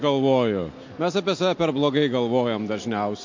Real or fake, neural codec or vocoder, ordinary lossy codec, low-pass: real; none; MP3, 48 kbps; 7.2 kHz